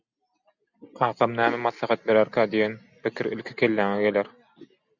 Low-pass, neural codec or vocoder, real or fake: 7.2 kHz; none; real